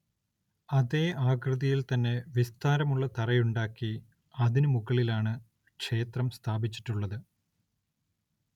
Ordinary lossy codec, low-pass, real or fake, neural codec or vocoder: none; 19.8 kHz; real; none